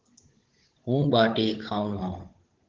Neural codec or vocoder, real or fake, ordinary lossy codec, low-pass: codec, 16 kHz, 16 kbps, FunCodec, trained on Chinese and English, 50 frames a second; fake; Opus, 16 kbps; 7.2 kHz